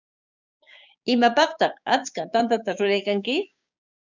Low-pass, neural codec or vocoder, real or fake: 7.2 kHz; codec, 16 kHz, 6 kbps, DAC; fake